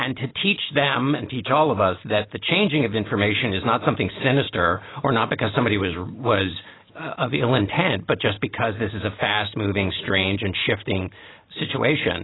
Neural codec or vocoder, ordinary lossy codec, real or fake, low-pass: none; AAC, 16 kbps; real; 7.2 kHz